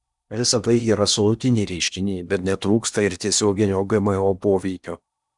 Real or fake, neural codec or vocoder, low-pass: fake; codec, 16 kHz in and 24 kHz out, 0.8 kbps, FocalCodec, streaming, 65536 codes; 10.8 kHz